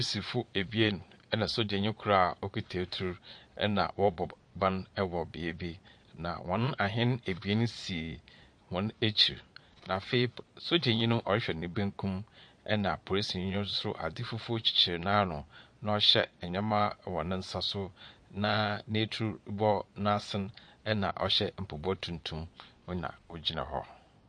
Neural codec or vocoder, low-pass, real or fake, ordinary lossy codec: vocoder, 22.05 kHz, 80 mel bands, Vocos; 9.9 kHz; fake; MP3, 48 kbps